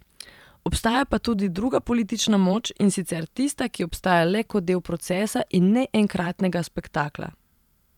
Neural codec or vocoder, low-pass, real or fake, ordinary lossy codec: vocoder, 44.1 kHz, 128 mel bands every 512 samples, BigVGAN v2; 19.8 kHz; fake; none